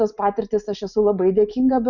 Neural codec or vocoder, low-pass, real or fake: none; 7.2 kHz; real